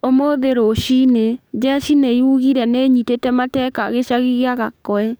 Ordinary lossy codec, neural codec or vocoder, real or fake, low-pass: none; codec, 44.1 kHz, 7.8 kbps, DAC; fake; none